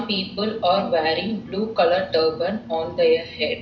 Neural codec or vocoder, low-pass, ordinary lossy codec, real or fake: none; 7.2 kHz; none; real